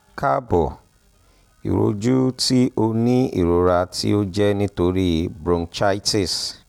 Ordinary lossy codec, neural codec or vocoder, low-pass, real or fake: none; none; 19.8 kHz; real